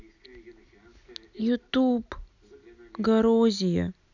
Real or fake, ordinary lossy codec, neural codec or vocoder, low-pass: real; none; none; 7.2 kHz